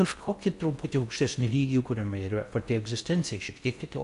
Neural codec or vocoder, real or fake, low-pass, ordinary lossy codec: codec, 16 kHz in and 24 kHz out, 0.6 kbps, FocalCodec, streaming, 4096 codes; fake; 10.8 kHz; AAC, 96 kbps